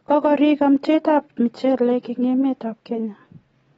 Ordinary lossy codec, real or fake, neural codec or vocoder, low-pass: AAC, 24 kbps; real; none; 19.8 kHz